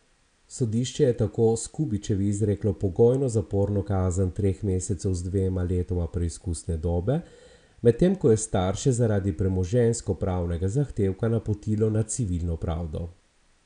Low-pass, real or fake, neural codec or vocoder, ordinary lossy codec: 9.9 kHz; real; none; none